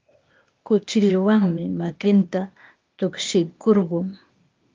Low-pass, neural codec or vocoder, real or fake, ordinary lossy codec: 7.2 kHz; codec, 16 kHz, 0.8 kbps, ZipCodec; fake; Opus, 24 kbps